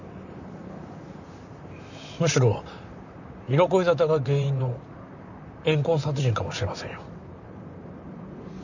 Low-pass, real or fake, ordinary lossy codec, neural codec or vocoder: 7.2 kHz; fake; none; vocoder, 44.1 kHz, 128 mel bands, Pupu-Vocoder